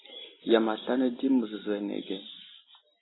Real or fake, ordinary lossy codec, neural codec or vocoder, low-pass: real; AAC, 16 kbps; none; 7.2 kHz